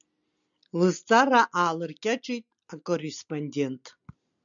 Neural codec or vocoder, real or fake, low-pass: none; real; 7.2 kHz